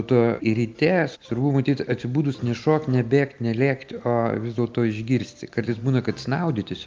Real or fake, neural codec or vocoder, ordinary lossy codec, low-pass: real; none; Opus, 24 kbps; 7.2 kHz